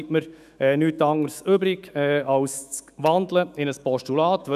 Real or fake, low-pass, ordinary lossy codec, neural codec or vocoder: fake; 14.4 kHz; none; autoencoder, 48 kHz, 128 numbers a frame, DAC-VAE, trained on Japanese speech